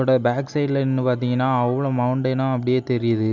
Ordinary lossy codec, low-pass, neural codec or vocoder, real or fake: none; 7.2 kHz; none; real